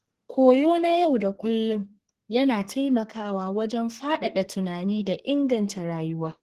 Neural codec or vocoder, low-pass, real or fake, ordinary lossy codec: codec, 32 kHz, 1.9 kbps, SNAC; 14.4 kHz; fake; Opus, 16 kbps